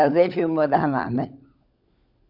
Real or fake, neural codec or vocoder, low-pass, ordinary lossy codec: fake; codec, 16 kHz, 16 kbps, FunCodec, trained on LibriTTS, 50 frames a second; 5.4 kHz; Opus, 64 kbps